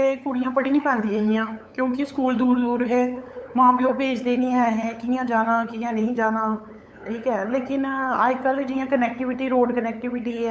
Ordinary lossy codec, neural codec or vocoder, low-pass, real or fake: none; codec, 16 kHz, 8 kbps, FunCodec, trained on LibriTTS, 25 frames a second; none; fake